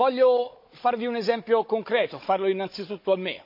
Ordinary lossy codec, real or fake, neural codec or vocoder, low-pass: MP3, 32 kbps; fake; codec, 16 kHz, 16 kbps, FunCodec, trained on Chinese and English, 50 frames a second; 5.4 kHz